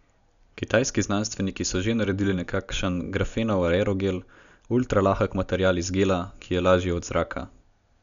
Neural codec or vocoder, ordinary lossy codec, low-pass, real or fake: none; none; 7.2 kHz; real